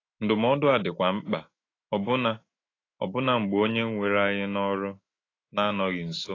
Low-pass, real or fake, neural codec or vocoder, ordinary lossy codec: 7.2 kHz; fake; autoencoder, 48 kHz, 128 numbers a frame, DAC-VAE, trained on Japanese speech; AAC, 32 kbps